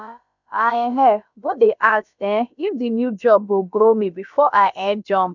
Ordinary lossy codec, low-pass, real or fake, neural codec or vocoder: none; 7.2 kHz; fake; codec, 16 kHz, about 1 kbps, DyCAST, with the encoder's durations